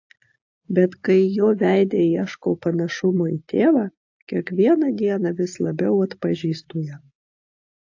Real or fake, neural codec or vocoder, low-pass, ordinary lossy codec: real; none; 7.2 kHz; AAC, 48 kbps